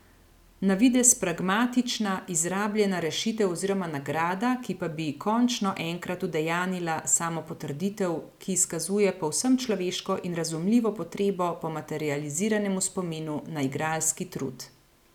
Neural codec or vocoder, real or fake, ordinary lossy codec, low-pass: none; real; none; 19.8 kHz